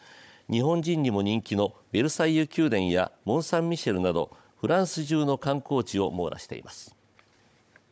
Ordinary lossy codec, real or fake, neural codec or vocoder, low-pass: none; fake; codec, 16 kHz, 16 kbps, FunCodec, trained on Chinese and English, 50 frames a second; none